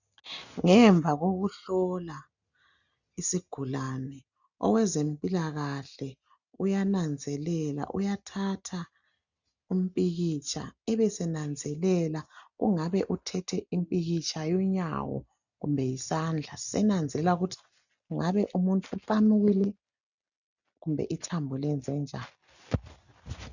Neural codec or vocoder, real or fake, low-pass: none; real; 7.2 kHz